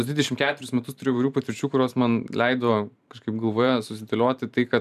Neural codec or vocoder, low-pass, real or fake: vocoder, 44.1 kHz, 128 mel bands every 512 samples, BigVGAN v2; 14.4 kHz; fake